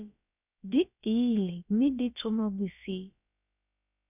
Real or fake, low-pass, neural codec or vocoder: fake; 3.6 kHz; codec, 16 kHz, about 1 kbps, DyCAST, with the encoder's durations